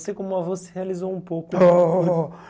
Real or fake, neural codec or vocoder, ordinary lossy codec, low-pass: real; none; none; none